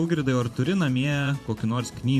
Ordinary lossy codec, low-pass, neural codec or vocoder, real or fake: MP3, 64 kbps; 14.4 kHz; vocoder, 44.1 kHz, 128 mel bands every 512 samples, BigVGAN v2; fake